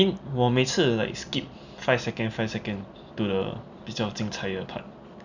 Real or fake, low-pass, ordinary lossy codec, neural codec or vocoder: real; 7.2 kHz; none; none